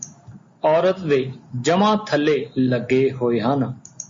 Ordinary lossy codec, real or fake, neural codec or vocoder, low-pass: MP3, 32 kbps; real; none; 7.2 kHz